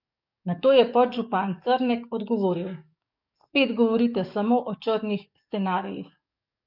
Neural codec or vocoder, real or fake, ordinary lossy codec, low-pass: codec, 44.1 kHz, 7.8 kbps, DAC; fake; none; 5.4 kHz